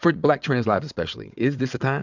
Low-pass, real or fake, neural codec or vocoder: 7.2 kHz; fake; vocoder, 22.05 kHz, 80 mel bands, WaveNeXt